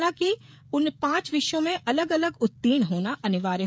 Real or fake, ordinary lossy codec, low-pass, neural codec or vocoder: fake; none; none; codec, 16 kHz, 16 kbps, FreqCodec, smaller model